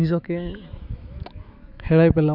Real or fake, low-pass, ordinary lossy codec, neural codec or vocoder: fake; 5.4 kHz; none; autoencoder, 48 kHz, 128 numbers a frame, DAC-VAE, trained on Japanese speech